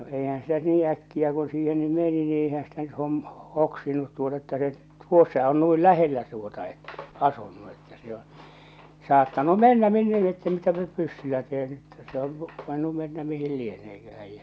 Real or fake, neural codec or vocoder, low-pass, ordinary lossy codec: real; none; none; none